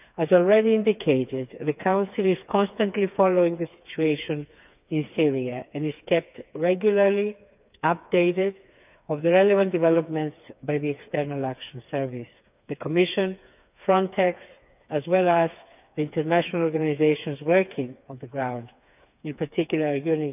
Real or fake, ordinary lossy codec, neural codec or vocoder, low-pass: fake; none; codec, 16 kHz, 4 kbps, FreqCodec, smaller model; 3.6 kHz